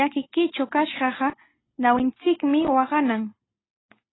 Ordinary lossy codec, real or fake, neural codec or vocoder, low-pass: AAC, 16 kbps; real; none; 7.2 kHz